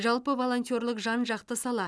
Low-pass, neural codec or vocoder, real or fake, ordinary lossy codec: none; none; real; none